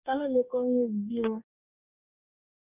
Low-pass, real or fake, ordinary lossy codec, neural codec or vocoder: 3.6 kHz; fake; none; codec, 44.1 kHz, 2.6 kbps, DAC